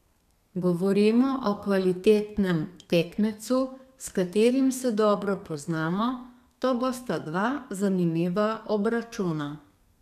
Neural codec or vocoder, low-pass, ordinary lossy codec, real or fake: codec, 32 kHz, 1.9 kbps, SNAC; 14.4 kHz; none; fake